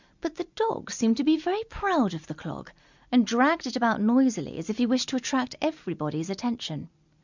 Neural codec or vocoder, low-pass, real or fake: none; 7.2 kHz; real